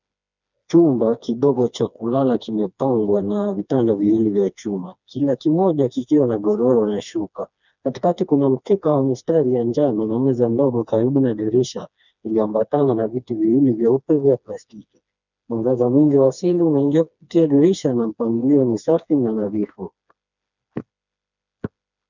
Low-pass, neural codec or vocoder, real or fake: 7.2 kHz; codec, 16 kHz, 2 kbps, FreqCodec, smaller model; fake